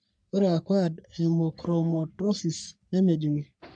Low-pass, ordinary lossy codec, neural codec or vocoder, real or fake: 9.9 kHz; none; codec, 44.1 kHz, 3.4 kbps, Pupu-Codec; fake